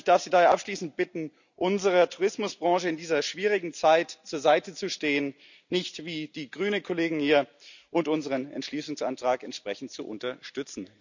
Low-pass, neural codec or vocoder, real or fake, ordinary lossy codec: 7.2 kHz; none; real; none